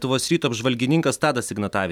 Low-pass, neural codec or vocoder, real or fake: 19.8 kHz; none; real